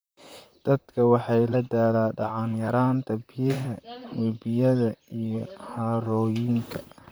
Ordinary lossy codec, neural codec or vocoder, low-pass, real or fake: none; vocoder, 44.1 kHz, 128 mel bands, Pupu-Vocoder; none; fake